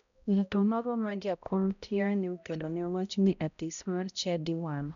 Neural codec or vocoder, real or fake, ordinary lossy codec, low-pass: codec, 16 kHz, 0.5 kbps, X-Codec, HuBERT features, trained on balanced general audio; fake; none; 7.2 kHz